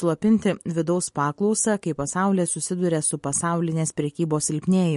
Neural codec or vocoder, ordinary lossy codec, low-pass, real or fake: none; MP3, 48 kbps; 14.4 kHz; real